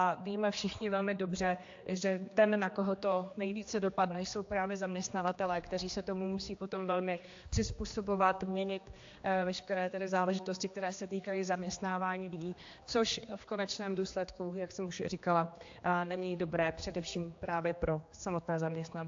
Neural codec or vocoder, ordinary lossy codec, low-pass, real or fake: codec, 16 kHz, 2 kbps, X-Codec, HuBERT features, trained on general audio; AAC, 48 kbps; 7.2 kHz; fake